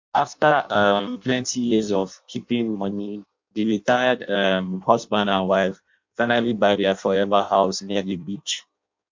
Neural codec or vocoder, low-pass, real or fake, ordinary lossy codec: codec, 16 kHz in and 24 kHz out, 0.6 kbps, FireRedTTS-2 codec; 7.2 kHz; fake; MP3, 64 kbps